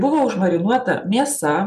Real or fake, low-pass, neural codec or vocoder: real; 14.4 kHz; none